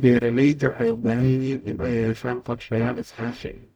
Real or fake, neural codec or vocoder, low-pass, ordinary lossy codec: fake; codec, 44.1 kHz, 0.9 kbps, DAC; none; none